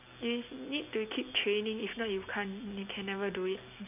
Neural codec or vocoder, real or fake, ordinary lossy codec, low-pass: none; real; none; 3.6 kHz